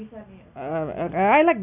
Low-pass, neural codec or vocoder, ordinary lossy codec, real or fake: 3.6 kHz; none; none; real